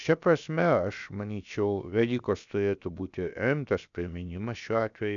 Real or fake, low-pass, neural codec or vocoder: fake; 7.2 kHz; codec, 16 kHz, about 1 kbps, DyCAST, with the encoder's durations